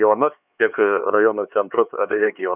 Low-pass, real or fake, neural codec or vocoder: 3.6 kHz; fake; codec, 16 kHz, 4 kbps, X-Codec, HuBERT features, trained on LibriSpeech